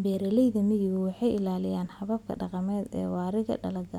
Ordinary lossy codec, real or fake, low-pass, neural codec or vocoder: none; real; 19.8 kHz; none